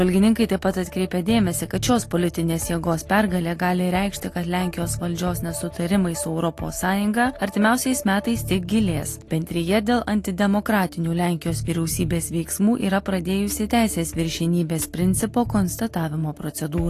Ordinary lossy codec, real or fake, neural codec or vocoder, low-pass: AAC, 48 kbps; real; none; 14.4 kHz